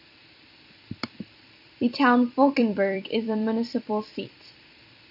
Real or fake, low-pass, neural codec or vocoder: fake; 5.4 kHz; vocoder, 44.1 kHz, 128 mel bands every 256 samples, BigVGAN v2